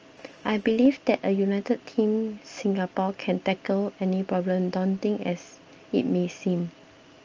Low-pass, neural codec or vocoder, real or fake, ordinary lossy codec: 7.2 kHz; none; real; Opus, 24 kbps